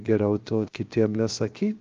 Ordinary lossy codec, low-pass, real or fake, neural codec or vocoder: Opus, 24 kbps; 7.2 kHz; fake; codec, 16 kHz, 0.7 kbps, FocalCodec